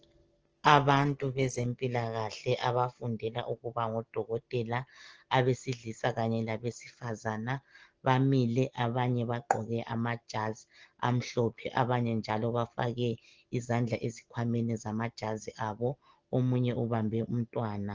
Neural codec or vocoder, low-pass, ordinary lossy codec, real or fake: none; 7.2 kHz; Opus, 16 kbps; real